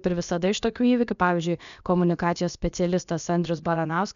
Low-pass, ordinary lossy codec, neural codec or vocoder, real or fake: 7.2 kHz; MP3, 96 kbps; codec, 16 kHz, about 1 kbps, DyCAST, with the encoder's durations; fake